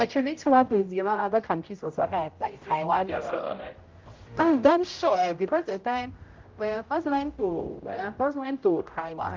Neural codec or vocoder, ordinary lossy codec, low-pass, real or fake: codec, 16 kHz, 0.5 kbps, X-Codec, HuBERT features, trained on general audio; Opus, 32 kbps; 7.2 kHz; fake